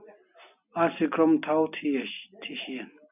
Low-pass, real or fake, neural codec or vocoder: 3.6 kHz; real; none